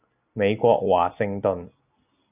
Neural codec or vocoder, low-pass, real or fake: none; 3.6 kHz; real